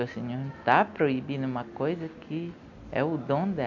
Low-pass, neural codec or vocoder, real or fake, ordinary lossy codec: 7.2 kHz; none; real; none